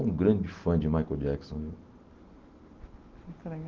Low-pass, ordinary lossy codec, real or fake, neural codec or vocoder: 7.2 kHz; Opus, 32 kbps; real; none